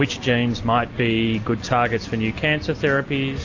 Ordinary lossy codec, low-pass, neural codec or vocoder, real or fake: AAC, 48 kbps; 7.2 kHz; none; real